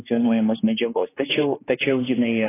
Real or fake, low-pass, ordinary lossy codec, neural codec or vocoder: fake; 3.6 kHz; AAC, 16 kbps; codec, 16 kHz, 2 kbps, X-Codec, HuBERT features, trained on general audio